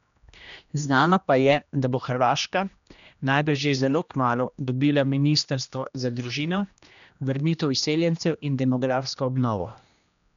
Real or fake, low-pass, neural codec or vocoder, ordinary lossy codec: fake; 7.2 kHz; codec, 16 kHz, 1 kbps, X-Codec, HuBERT features, trained on general audio; none